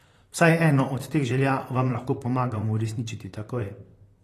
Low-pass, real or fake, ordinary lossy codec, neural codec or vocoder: 14.4 kHz; fake; AAC, 48 kbps; vocoder, 44.1 kHz, 128 mel bands, Pupu-Vocoder